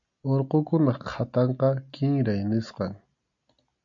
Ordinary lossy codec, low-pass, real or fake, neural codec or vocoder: MP3, 64 kbps; 7.2 kHz; real; none